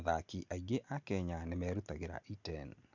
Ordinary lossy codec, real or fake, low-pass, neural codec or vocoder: none; real; 7.2 kHz; none